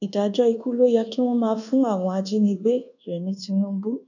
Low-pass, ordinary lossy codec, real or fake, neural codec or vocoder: 7.2 kHz; none; fake; codec, 24 kHz, 1.2 kbps, DualCodec